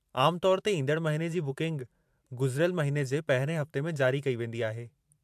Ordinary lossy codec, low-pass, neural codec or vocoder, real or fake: AAC, 96 kbps; 14.4 kHz; vocoder, 44.1 kHz, 128 mel bands every 512 samples, BigVGAN v2; fake